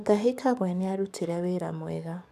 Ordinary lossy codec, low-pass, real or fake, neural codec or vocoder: none; 14.4 kHz; fake; codec, 44.1 kHz, 7.8 kbps, DAC